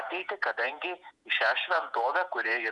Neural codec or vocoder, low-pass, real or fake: vocoder, 48 kHz, 128 mel bands, Vocos; 10.8 kHz; fake